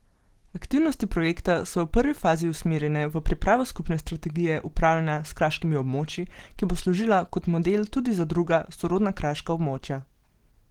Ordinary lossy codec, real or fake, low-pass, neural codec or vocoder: Opus, 16 kbps; real; 19.8 kHz; none